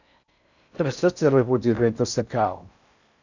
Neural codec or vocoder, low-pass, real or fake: codec, 16 kHz in and 24 kHz out, 0.6 kbps, FocalCodec, streaming, 4096 codes; 7.2 kHz; fake